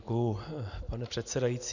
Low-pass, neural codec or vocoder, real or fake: 7.2 kHz; none; real